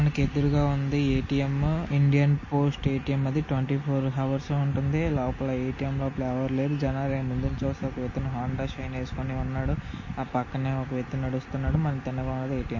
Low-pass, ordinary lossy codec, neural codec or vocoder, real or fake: 7.2 kHz; MP3, 32 kbps; none; real